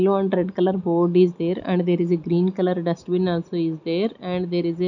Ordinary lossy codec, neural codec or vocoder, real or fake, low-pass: none; none; real; 7.2 kHz